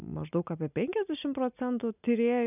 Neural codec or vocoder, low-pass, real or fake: none; 3.6 kHz; real